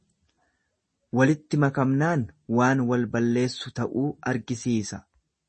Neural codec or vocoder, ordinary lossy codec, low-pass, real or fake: none; MP3, 32 kbps; 10.8 kHz; real